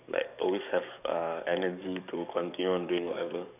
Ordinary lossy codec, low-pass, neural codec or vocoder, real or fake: none; 3.6 kHz; codec, 44.1 kHz, 7.8 kbps, DAC; fake